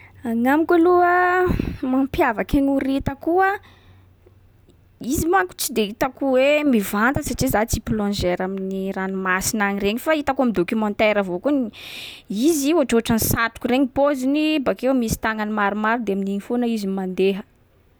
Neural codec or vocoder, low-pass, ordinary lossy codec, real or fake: none; none; none; real